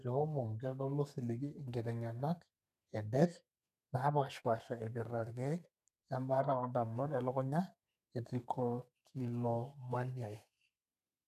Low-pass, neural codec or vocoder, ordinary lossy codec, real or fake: 14.4 kHz; codec, 32 kHz, 1.9 kbps, SNAC; none; fake